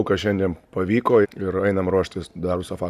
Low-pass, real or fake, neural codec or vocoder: 14.4 kHz; real; none